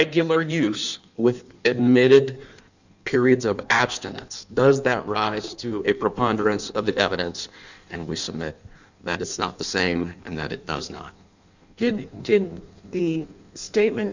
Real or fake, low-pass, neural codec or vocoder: fake; 7.2 kHz; codec, 16 kHz in and 24 kHz out, 1.1 kbps, FireRedTTS-2 codec